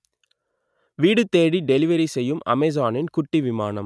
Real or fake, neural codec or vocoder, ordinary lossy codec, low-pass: real; none; none; none